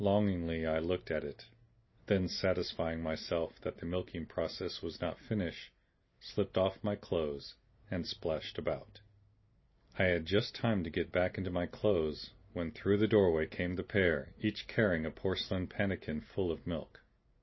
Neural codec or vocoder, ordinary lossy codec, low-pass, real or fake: none; MP3, 24 kbps; 7.2 kHz; real